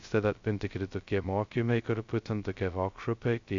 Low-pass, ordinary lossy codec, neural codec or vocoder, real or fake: 7.2 kHz; Opus, 64 kbps; codec, 16 kHz, 0.2 kbps, FocalCodec; fake